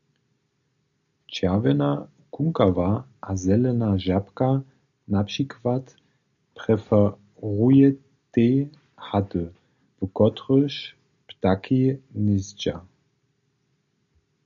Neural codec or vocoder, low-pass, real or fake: none; 7.2 kHz; real